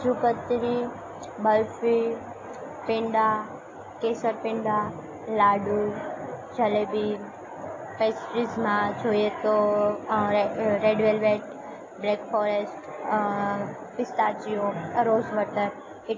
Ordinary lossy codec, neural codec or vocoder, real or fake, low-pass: AAC, 32 kbps; none; real; 7.2 kHz